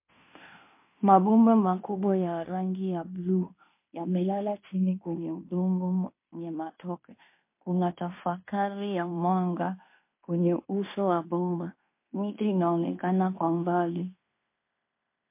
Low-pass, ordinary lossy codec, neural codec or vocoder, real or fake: 3.6 kHz; MP3, 24 kbps; codec, 16 kHz in and 24 kHz out, 0.9 kbps, LongCat-Audio-Codec, fine tuned four codebook decoder; fake